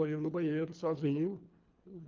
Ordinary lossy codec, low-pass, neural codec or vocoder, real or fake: Opus, 24 kbps; 7.2 kHz; codec, 24 kHz, 3 kbps, HILCodec; fake